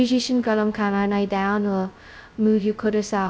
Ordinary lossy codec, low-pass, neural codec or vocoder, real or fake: none; none; codec, 16 kHz, 0.2 kbps, FocalCodec; fake